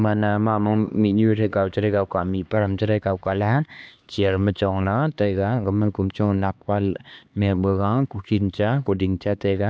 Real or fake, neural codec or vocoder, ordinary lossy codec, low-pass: fake; codec, 16 kHz, 2 kbps, X-Codec, HuBERT features, trained on LibriSpeech; none; none